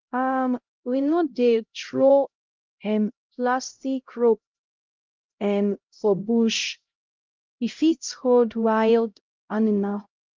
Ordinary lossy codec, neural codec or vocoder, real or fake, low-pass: Opus, 24 kbps; codec, 16 kHz, 0.5 kbps, X-Codec, HuBERT features, trained on LibriSpeech; fake; 7.2 kHz